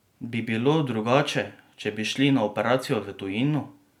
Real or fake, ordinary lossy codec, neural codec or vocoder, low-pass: real; none; none; 19.8 kHz